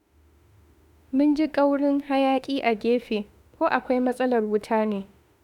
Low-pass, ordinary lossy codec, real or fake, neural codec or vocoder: 19.8 kHz; none; fake; autoencoder, 48 kHz, 32 numbers a frame, DAC-VAE, trained on Japanese speech